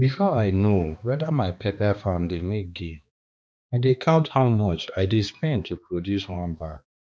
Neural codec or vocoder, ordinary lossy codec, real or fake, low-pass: codec, 16 kHz, 2 kbps, X-Codec, HuBERT features, trained on balanced general audio; none; fake; none